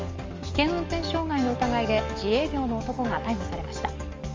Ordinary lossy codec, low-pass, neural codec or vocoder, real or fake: Opus, 32 kbps; 7.2 kHz; codec, 44.1 kHz, 7.8 kbps, DAC; fake